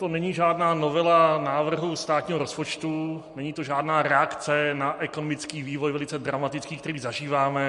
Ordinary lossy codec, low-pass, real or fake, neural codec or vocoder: MP3, 48 kbps; 14.4 kHz; real; none